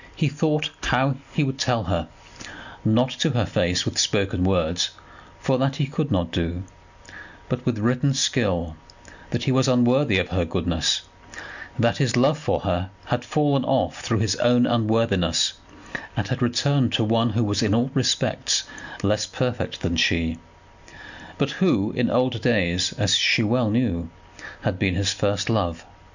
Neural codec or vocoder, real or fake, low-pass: none; real; 7.2 kHz